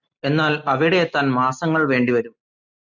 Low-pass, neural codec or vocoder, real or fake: 7.2 kHz; none; real